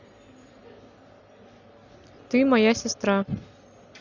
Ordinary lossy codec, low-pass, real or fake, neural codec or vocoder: none; 7.2 kHz; real; none